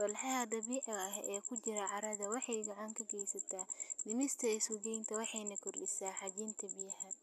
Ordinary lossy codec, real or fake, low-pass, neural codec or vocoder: none; real; 14.4 kHz; none